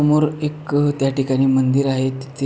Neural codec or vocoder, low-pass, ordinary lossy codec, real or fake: none; none; none; real